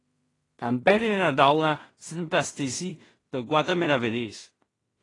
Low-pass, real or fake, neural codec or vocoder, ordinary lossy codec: 10.8 kHz; fake; codec, 16 kHz in and 24 kHz out, 0.4 kbps, LongCat-Audio-Codec, two codebook decoder; AAC, 32 kbps